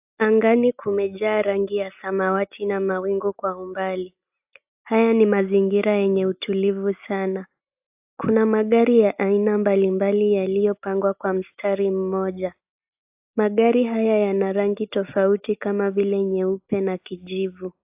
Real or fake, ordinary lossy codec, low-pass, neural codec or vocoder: real; AAC, 32 kbps; 3.6 kHz; none